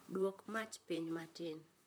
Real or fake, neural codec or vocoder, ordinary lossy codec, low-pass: fake; vocoder, 44.1 kHz, 128 mel bands, Pupu-Vocoder; none; none